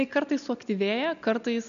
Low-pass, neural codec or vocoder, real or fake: 7.2 kHz; none; real